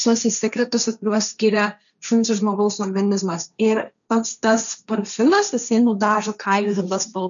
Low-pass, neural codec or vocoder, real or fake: 7.2 kHz; codec, 16 kHz, 1.1 kbps, Voila-Tokenizer; fake